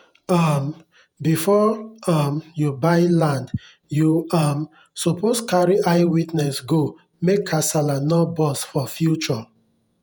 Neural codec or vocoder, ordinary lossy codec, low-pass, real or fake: vocoder, 48 kHz, 128 mel bands, Vocos; none; none; fake